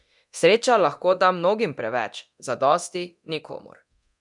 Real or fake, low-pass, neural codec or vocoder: fake; 10.8 kHz; codec, 24 kHz, 0.9 kbps, DualCodec